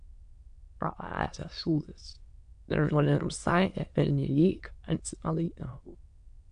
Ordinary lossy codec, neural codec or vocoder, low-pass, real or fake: MP3, 64 kbps; autoencoder, 22.05 kHz, a latent of 192 numbers a frame, VITS, trained on many speakers; 9.9 kHz; fake